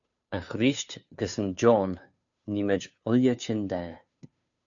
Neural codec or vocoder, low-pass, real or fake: codec, 16 kHz, 2 kbps, FunCodec, trained on Chinese and English, 25 frames a second; 7.2 kHz; fake